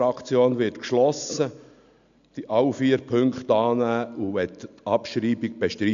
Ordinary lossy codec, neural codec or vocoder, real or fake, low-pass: MP3, 64 kbps; none; real; 7.2 kHz